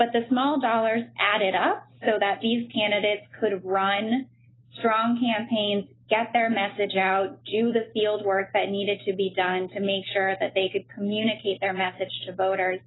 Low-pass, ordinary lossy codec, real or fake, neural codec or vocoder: 7.2 kHz; AAC, 16 kbps; real; none